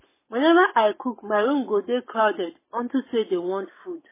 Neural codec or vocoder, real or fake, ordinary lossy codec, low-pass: codec, 44.1 kHz, 7.8 kbps, Pupu-Codec; fake; MP3, 16 kbps; 3.6 kHz